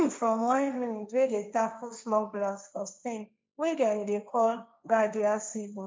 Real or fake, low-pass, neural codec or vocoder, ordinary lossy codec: fake; none; codec, 16 kHz, 1.1 kbps, Voila-Tokenizer; none